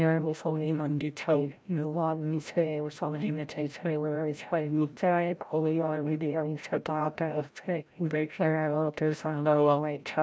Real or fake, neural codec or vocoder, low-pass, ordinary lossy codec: fake; codec, 16 kHz, 0.5 kbps, FreqCodec, larger model; none; none